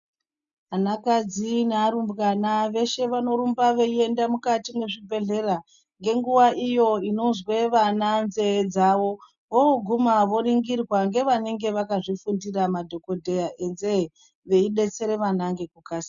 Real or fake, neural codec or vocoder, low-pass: real; none; 7.2 kHz